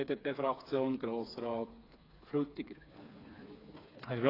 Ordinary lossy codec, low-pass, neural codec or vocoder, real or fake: AAC, 24 kbps; 5.4 kHz; codec, 16 kHz, 4 kbps, FreqCodec, smaller model; fake